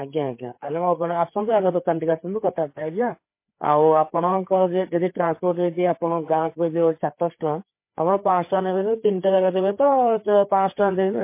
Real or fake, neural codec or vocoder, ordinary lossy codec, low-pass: fake; codec, 16 kHz, 4 kbps, FreqCodec, larger model; MP3, 24 kbps; 3.6 kHz